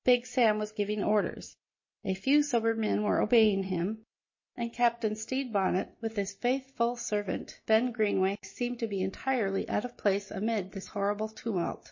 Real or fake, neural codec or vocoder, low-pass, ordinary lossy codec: real; none; 7.2 kHz; MP3, 32 kbps